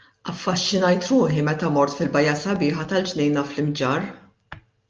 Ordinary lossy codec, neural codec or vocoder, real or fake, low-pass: Opus, 24 kbps; none; real; 7.2 kHz